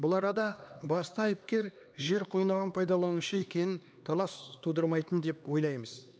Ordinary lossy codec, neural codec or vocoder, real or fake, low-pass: none; codec, 16 kHz, 2 kbps, X-Codec, HuBERT features, trained on LibriSpeech; fake; none